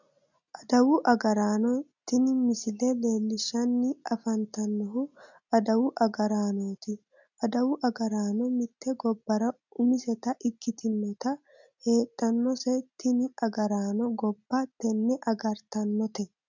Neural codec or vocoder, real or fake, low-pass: none; real; 7.2 kHz